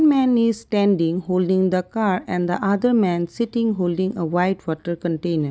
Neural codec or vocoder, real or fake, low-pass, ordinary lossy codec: none; real; none; none